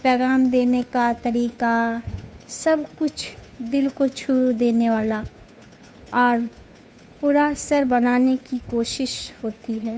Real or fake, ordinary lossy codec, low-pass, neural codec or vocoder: fake; none; none; codec, 16 kHz, 2 kbps, FunCodec, trained on Chinese and English, 25 frames a second